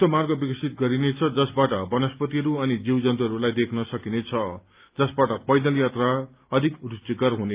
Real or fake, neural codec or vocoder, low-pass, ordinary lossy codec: real; none; 3.6 kHz; Opus, 24 kbps